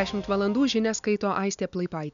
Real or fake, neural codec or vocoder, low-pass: real; none; 7.2 kHz